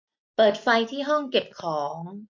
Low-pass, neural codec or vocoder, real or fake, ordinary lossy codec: 7.2 kHz; none; real; MP3, 48 kbps